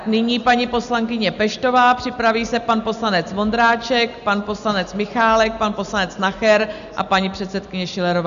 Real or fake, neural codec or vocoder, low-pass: real; none; 7.2 kHz